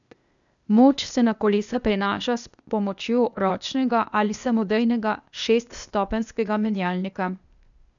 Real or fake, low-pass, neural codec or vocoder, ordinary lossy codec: fake; 7.2 kHz; codec, 16 kHz, 0.8 kbps, ZipCodec; none